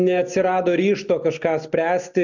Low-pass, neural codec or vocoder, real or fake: 7.2 kHz; none; real